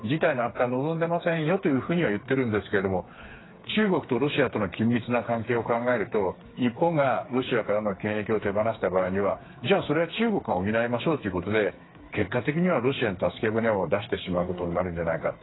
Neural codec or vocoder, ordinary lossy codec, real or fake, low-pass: codec, 16 kHz, 4 kbps, FreqCodec, smaller model; AAC, 16 kbps; fake; 7.2 kHz